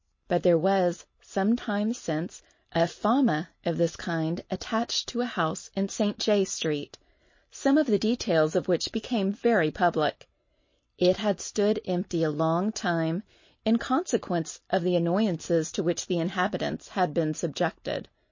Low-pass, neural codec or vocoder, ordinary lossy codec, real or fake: 7.2 kHz; none; MP3, 32 kbps; real